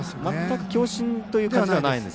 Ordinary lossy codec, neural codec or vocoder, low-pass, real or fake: none; none; none; real